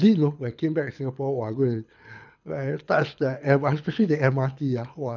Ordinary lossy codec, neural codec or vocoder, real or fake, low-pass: none; codec, 24 kHz, 6 kbps, HILCodec; fake; 7.2 kHz